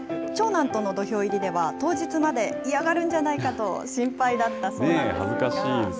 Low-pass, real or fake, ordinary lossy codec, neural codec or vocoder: none; real; none; none